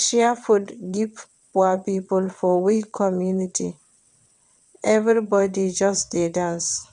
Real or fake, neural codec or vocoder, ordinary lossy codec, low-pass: fake; vocoder, 22.05 kHz, 80 mel bands, WaveNeXt; none; 9.9 kHz